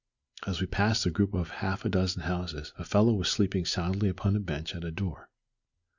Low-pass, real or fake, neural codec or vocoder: 7.2 kHz; real; none